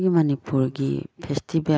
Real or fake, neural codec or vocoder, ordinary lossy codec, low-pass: real; none; none; none